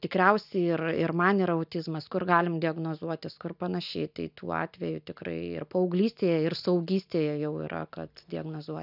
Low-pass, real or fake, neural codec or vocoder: 5.4 kHz; real; none